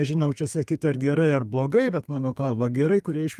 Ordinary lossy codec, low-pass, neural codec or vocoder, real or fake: Opus, 32 kbps; 14.4 kHz; codec, 32 kHz, 1.9 kbps, SNAC; fake